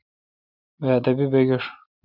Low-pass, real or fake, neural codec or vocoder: 5.4 kHz; real; none